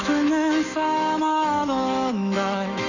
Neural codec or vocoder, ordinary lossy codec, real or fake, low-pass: autoencoder, 48 kHz, 128 numbers a frame, DAC-VAE, trained on Japanese speech; none; fake; 7.2 kHz